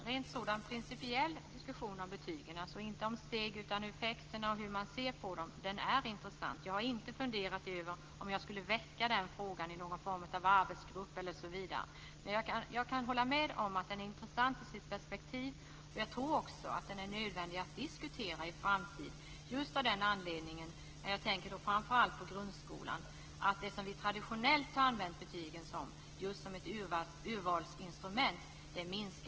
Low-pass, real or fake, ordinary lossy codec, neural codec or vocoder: 7.2 kHz; real; Opus, 16 kbps; none